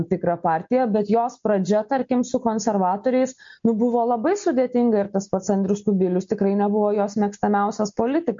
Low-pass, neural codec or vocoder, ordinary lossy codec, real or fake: 7.2 kHz; none; MP3, 48 kbps; real